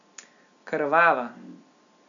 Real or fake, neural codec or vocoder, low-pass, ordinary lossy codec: real; none; 7.2 kHz; none